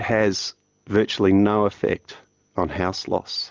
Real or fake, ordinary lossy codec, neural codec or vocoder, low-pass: real; Opus, 24 kbps; none; 7.2 kHz